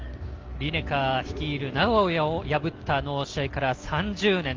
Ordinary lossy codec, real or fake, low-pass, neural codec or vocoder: Opus, 32 kbps; real; 7.2 kHz; none